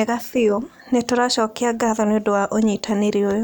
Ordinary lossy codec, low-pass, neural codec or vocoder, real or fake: none; none; none; real